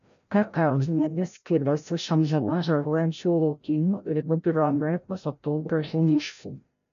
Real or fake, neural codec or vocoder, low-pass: fake; codec, 16 kHz, 0.5 kbps, FreqCodec, larger model; 7.2 kHz